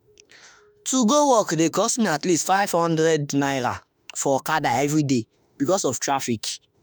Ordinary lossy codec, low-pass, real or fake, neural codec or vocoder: none; none; fake; autoencoder, 48 kHz, 32 numbers a frame, DAC-VAE, trained on Japanese speech